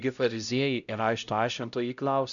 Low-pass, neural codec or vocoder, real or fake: 7.2 kHz; codec, 16 kHz, 0.5 kbps, X-Codec, HuBERT features, trained on LibriSpeech; fake